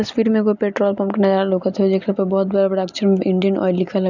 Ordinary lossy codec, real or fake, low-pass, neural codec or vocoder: none; real; 7.2 kHz; none